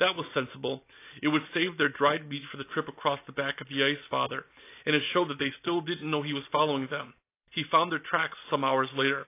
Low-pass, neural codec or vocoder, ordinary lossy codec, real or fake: 3.6 kHz; none; AAC, 24 kbps; real